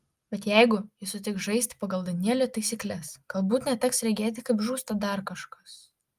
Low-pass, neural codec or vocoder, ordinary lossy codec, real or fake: 14.4 kHz; none; Opus, 32 kbps; real